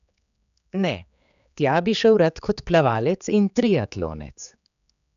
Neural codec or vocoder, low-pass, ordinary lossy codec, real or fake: codec, 16 kHz, 4 kbps, X-Codec, HuBERT features, trained on general audio; 7.2 kHz; none; fake